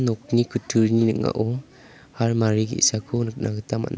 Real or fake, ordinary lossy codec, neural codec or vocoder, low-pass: real; none; none; none